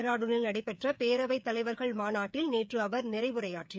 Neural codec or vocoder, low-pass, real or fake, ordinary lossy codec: codec, 16 kHz, 8 kbps, FreqCodec, smaller model; none; fake; none